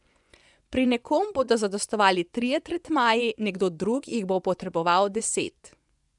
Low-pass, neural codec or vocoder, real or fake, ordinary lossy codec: 10.8 kHz; vocoder, 24 kHz, 100 mel bands, Vocos; fake; none